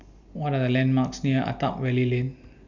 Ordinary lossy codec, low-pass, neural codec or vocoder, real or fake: none; 7.2 kHz; none; real